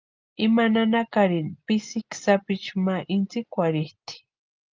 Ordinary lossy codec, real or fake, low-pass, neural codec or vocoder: Opus, 24 kbps; real; 7.2 kHz; none